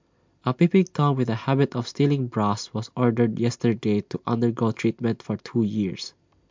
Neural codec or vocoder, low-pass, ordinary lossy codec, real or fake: none; 7.2 kHz; MP3, 64 kbps; real